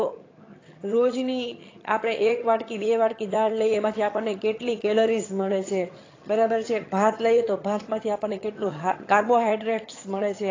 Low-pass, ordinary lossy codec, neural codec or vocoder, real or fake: 7.2 kHz; AAC, 32 kbps; vocoder, 22.05 kHz, 80 mel bands, HiFi-GAN; fake